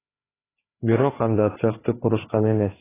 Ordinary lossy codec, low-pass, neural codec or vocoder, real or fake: AAC, 16 kbps; 3.6 kHz; codec, 16 kHz, 4 kbps, FreqCodec, larger model; fake